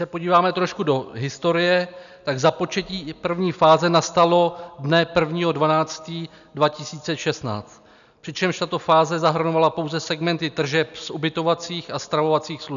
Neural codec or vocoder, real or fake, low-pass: none; real; 7.2 kHz